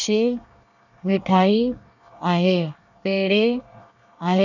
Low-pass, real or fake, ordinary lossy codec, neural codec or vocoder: 7.2 kHz; fake; none; codec, 24 kHz, 1 kbps, SNAC